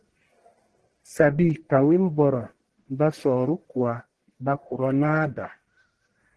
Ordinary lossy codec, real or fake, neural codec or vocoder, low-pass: Opus, 16 kbps; fake; codec, 44.1 kHz, 1.7 kbps, Pupu-Codec; 10.8 kHz